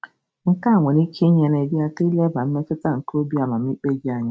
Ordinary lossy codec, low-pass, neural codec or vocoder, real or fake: none; none; none; real